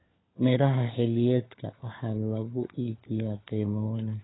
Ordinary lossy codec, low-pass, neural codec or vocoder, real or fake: AAC, 16 kbps; 7.2 kHz; codec, 16 kHz, 4 kbps, FunCodec, trained on LibriTTS, 50 frames a second; fake